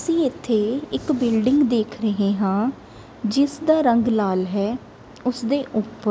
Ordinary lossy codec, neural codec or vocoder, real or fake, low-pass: none; none; real; none